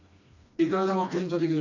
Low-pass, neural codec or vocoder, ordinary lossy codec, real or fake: 7.2 kHz; codec, 16 kHz, 2 kbps, FreqCodec, smaller model; none; fake